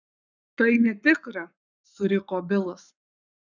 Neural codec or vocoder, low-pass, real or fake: codec, 44.1 kHz, 7.8 kbps, Pupu-Codec; 7.2 kHz; fake